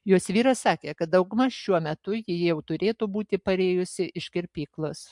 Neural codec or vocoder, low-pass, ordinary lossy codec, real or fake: none; 10.8 kHz; MP3, 64 kbps; real